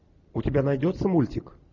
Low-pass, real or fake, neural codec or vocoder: 7.2 kHz; real; none